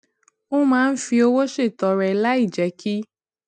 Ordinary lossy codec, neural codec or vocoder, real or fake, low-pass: none; none; real; 10.8 kHz